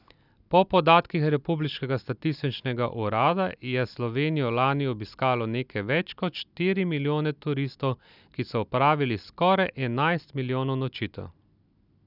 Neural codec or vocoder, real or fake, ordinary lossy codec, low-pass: none; real; none; 5.4 kHz